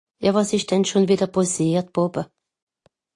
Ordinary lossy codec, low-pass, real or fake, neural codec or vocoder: AAC, 48 kbps; 10.8 kHz; real; none